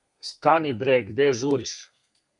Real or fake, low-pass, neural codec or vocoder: fake; 10.8 kHz; codec, 32 kHz, 1.9 kbps, SNAC